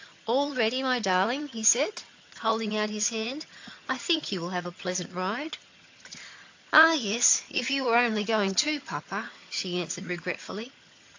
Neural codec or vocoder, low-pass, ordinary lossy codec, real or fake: vocoder, 22.05 kHz, 80 mel bands, HiFi-GAN; 7.2 kHz; AAC, 48 kbps; fake